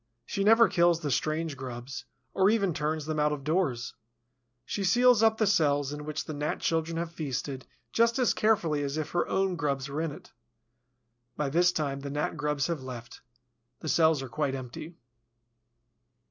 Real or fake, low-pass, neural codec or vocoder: real; 7.2 kHz; none